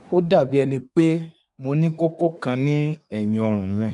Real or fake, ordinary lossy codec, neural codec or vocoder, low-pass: fake; none; codec, 24 kHz, 1 kbps, SNAC; 10.8 kHz